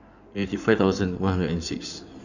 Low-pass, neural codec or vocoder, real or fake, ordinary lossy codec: 7.2 kHz; codec, 16 kHz in and 24 kHz out, 2.2 kbps, FireRedTTS-2 codec; fake; none